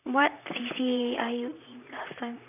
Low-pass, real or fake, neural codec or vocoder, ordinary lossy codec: 3.6 kHz; fake; vocoder, 44.1 kHz, 128 mel bands, Pupu-Vocoder; none